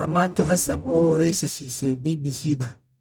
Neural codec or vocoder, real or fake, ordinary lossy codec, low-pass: codec, 44.1 kHz, 0.9 kbps, DAC; fake; none; none